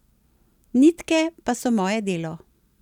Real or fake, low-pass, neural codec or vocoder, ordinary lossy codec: real; 19.8 kHz; none; none